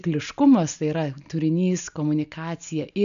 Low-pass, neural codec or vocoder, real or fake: 7.2 kHz; none; real